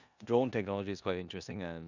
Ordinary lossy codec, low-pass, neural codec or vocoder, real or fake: none; 7.2 kHz; codec, 16 kHz in and 24 kHz out, 0.9 kbps, LongCat-Audio-Codec, four codebook decoder; fake